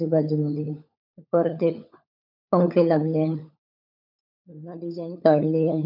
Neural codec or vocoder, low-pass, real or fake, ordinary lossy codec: codec, 16 kHz, 16 kbps, FunCodec, trained on LibriTTS, 50 frames a second; 5.4 kHz; fake; none